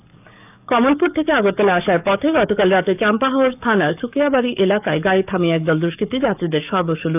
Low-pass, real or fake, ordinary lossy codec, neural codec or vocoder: 3.6 kHz; fake; none; codec, 44.1 kHz, 7.8 kbps, DAC